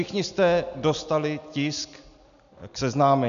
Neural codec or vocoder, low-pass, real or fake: none; 7.2 kHz; real